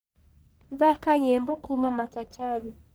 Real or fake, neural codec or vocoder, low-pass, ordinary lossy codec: fake; codec, 44.1 kHz, 1.7 kbps, Pupu-Codec; none; none